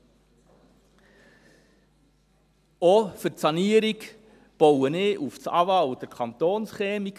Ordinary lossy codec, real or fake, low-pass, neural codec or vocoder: none; real; 14.4 kHz; none